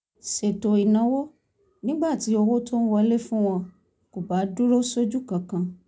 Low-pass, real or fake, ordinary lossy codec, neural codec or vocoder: none; real; none; none